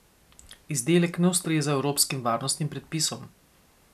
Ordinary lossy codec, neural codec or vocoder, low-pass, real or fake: none; none; 14.4 kHz; real